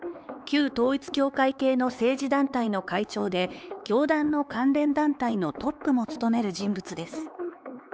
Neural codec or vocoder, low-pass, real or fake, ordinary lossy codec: codec, 16 kHz, 4 kbps, X-Codec, HuBERT features, trained on LibriSpeech; none; fake; none